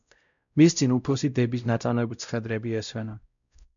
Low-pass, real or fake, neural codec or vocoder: 7.2 kHz; fake; codec, 16 kHz, 0.5 kbps, X-Codec, WavLM features, trained on Multilingual LibriSpeech